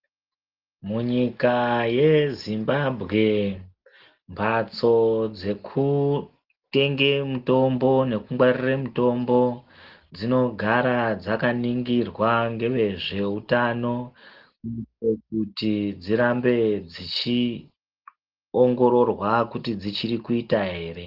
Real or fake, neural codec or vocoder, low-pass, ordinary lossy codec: real; none; 5.4 kHz; Opus, 16 kbps